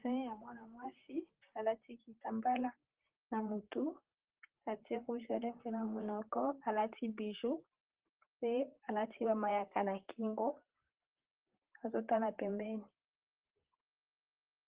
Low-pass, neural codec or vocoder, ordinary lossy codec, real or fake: 3.6 kHz; vocoder, 44.1 kHz, 128 mel bands, Pupu-Vocoder; Opus, 16 kbps; fake